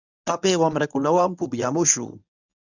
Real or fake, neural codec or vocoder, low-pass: fake; codec, 24 kHz, 0.9 kbps, WavTokenizer, medium speech release version 1; 7.2 kHz